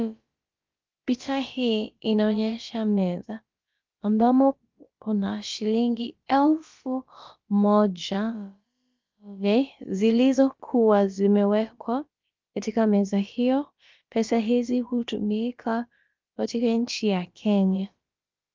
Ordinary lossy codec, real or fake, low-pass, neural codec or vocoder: Opus, 24 kbps; fake; 7.2 kHz; codec, 16 kHz, about 1 kbps, DyCAST, with the encoder's durations